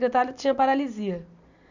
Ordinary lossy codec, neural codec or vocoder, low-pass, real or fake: none; none; 7.2 kHz; real